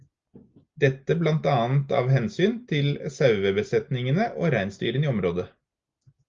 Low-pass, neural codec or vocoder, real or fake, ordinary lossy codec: 7.2 kHz; none; real; Opus, 32 kbps